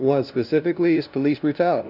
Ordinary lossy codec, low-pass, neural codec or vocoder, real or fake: Opus, 64 kbps; 5.4 kHz; codec, 16 kHz, 0.5 kbps, FunCodec, trained on LibriTTS, 25 frames a second; fake